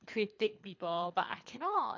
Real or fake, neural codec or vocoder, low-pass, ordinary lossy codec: fake; codec, 24 kHz, 3 kbps, HILCodec; 7.2 kHz; MP3, 64 kbps